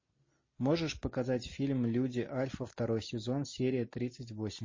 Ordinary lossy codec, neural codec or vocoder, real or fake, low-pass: MP3, 32 kbps; none; real; 7.2 kHz